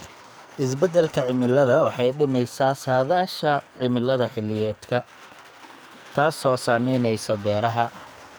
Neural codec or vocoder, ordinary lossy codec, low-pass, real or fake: codec, 44.1 kHz, 2.6 kbps, SNAC; none; none; fake